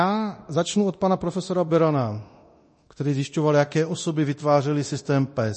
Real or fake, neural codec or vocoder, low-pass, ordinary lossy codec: fake; codec, 24 kHz, 0.9 kbps, DualCodec; 10.8 kHz; MP3, 32 kbps